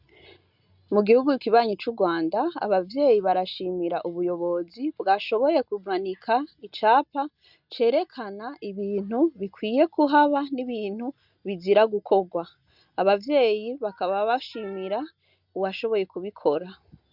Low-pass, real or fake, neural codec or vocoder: 5.4 kHz; real; none